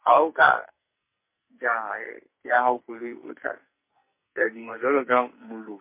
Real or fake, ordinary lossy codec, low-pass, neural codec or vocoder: fake; MP3, 24 kbps; 3.6 kHz; codec, 44.1 kHz, 2.6 kbps, SNAC